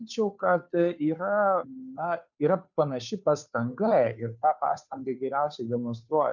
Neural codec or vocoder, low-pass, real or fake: codec, 16 kHz, 2 kbps, FunCodec, trained on Chinese and English, 25 frames a second; 7.2 kHz; fake